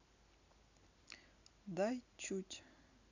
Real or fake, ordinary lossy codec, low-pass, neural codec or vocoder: real; none; 7.2 kHz; none